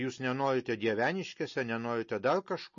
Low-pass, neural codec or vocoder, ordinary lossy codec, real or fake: 7.2 kHz; none; MP3, 32 kbps; real